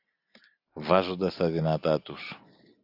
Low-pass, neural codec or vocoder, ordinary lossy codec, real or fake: 5.4 kHz; none; AAC, 48 kbps; real